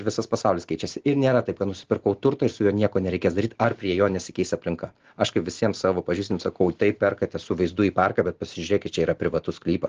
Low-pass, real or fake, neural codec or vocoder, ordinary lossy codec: 7.2 kHz; real; none; Opus, 16 kbps